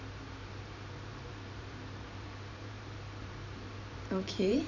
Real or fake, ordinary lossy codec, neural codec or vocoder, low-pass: fake; none; vocoder, 44.1 kHz, 128 mel bands every 512 samples, BigVGAN v2; 7.2 kHz